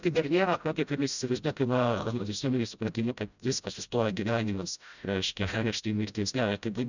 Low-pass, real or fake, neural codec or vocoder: 7.2 kHz; fake; codec, 16 kHz, 0.5 kbps, FreqCodec, smaller model